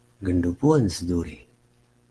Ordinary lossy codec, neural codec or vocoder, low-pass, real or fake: Opus, 16 kbps; none; 10.8 kHz; real